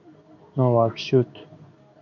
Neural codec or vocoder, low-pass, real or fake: codec, 16 kHz in and 24 kHz out, 1 kbps, XY-Tokenizer; 7.2 kHz; fake